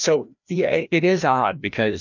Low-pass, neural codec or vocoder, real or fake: 7.2 kHz; codec, 16 kHz, 1 kbps, FreqCodec, larger model; fake